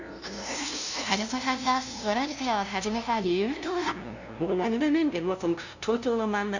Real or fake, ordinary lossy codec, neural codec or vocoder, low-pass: fake; none; codec, 16 kHz, 0.5 kbps, FunCodec, trained on LibriTTS, 25 frames a second; 7.2 kHz